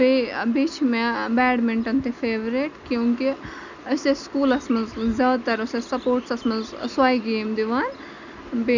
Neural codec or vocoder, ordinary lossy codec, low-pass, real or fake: none; none; 7.2 kHz; real